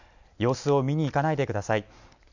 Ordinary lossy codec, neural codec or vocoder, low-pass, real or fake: none; none; 7.2 kHz; real